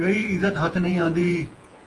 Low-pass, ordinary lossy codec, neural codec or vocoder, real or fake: 10.8 kHz; Opus, 24 kbps; vocoder, 48 kHz, 128 mel bands, Vocos; fake